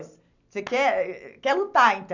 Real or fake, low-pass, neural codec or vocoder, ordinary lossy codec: real; 7.2 kHz; none; none